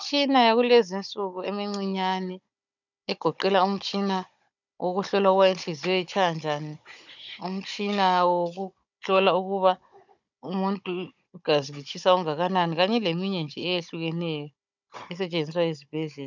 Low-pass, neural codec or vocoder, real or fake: 7.2 kHz; codec, 16 kHz, 16 kbps, FunCodec, trained on Chinese and English, 50 frames a second; fake